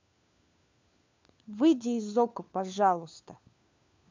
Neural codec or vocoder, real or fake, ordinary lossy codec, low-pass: codec, 16 kHz, 4 kbps, FunCodec, trained on LibriTTS, 50 frames a second; fake; MP3, 64 kbps; 7.2 kHz